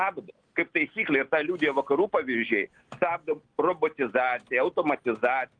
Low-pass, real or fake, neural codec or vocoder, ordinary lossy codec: 9.9 kHz; real; none; Opus, 24 kbps